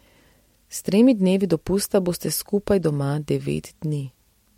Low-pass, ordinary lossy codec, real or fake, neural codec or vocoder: 19.8 kHz; MP3, 64 kbps; real; none